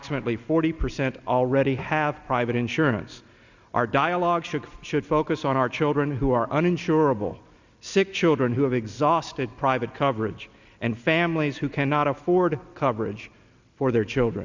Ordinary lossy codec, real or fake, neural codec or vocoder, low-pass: Opus, 64 kbps; real; none; 7.2 kHz